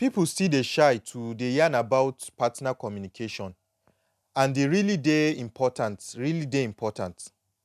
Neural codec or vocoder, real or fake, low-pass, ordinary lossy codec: none; real; 14.4 kHz; none